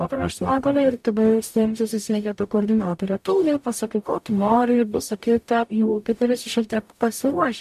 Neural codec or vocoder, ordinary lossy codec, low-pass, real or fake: codec, 44.1 kHz, 0.9 kbps, DAC; AAC, 96 kbps; 14.4 kHz; fake